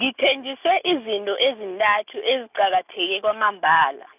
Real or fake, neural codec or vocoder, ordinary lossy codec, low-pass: real; none; none; 3.6 kHz